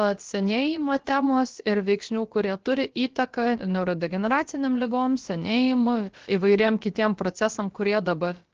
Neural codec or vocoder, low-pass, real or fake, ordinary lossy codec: codec, 16 kHz, about 1 kbps, DyCAST, with the encoder's durations; 7.2 kHz; fake; Opus, 16 kbps